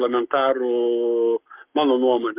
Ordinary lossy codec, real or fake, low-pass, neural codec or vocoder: Opus, 24 kbps; fake; 3.6 kHz; codec, 44.1 kHz, 7.8 kbps, Pupu-Codec